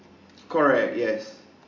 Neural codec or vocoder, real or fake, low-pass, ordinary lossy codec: none; real; 7.2 kHz; AAC, 48 kbps